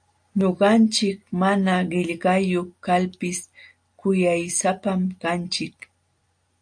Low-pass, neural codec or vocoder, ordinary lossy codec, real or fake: 9.9 kHz; none; AAC, 64 kbps; real